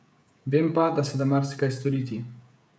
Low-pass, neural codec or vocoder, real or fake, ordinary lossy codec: none; codec, 16 kHz, 16 kbps, FreqCodec, smaller model; fake; none